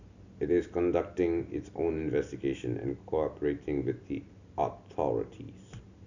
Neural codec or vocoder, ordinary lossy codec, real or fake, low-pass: none; none; real; 7.2 kHz